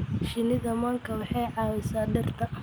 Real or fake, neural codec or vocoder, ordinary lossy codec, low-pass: real; none; none; none